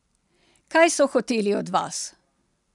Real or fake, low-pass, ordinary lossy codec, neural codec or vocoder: real; 10.8 kHz; none; none